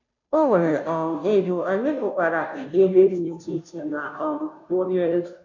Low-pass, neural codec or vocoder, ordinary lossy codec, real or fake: 7.2 kHz; codec, 16 kHz, 0.5 kbps, FunCodec, trained on Chinese and English, 25 frames a second; none; fake